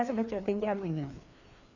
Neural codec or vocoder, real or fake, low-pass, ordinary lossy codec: codec, 16 kHz, 2 kbps, FreqCodec, larger model; fake; 7.2 kHz; none